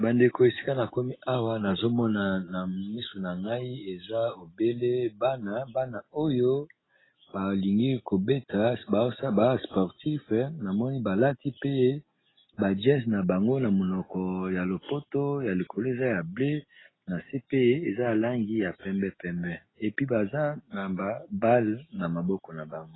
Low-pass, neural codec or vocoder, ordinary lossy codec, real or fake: 7.2 kHz; none; AAC, 16 kbps; real